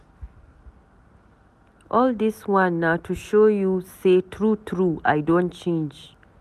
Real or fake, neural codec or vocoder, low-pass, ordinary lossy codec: real; none; 14.4 kHz; none